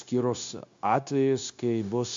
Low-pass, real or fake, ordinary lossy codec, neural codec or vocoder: 7.2 kHz; fake; MP3, 48 kbps; codec, 16 kHz, 0.9 kbps, LongCat-Audio-Codec